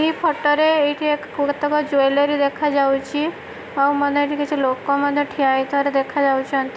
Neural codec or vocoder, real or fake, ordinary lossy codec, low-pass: none; real; none; none